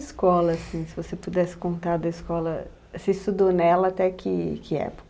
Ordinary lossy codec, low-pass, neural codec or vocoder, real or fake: none; none; none; real